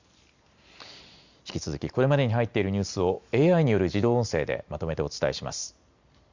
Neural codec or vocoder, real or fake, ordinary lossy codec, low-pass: none; real; Opus, 64 kbps; 7.2 kHz